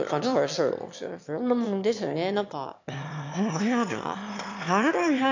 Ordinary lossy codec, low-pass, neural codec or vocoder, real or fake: MP3, 64 kbps; 7.2 kHz; autoencoder, 22.05 kHz, a latent of 192 numbers a frame, VITS, trained on one speaker; fake